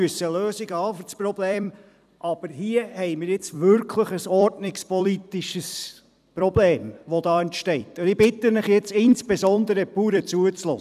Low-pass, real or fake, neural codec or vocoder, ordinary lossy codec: 14.4 kHz; fake; vocoder, 44.1 kHz, 128 mel bands every 256 samples, BigVGAN v2; none